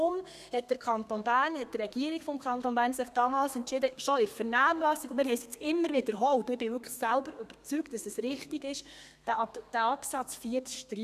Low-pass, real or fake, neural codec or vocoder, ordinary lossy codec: 14.4 kHz; fake; codec, 32 kHz, 1.9 kbps, SNAC; none